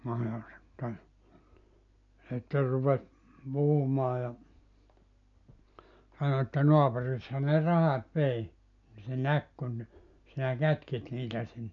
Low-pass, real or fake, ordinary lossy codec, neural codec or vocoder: 7.2 kHz; real; none; none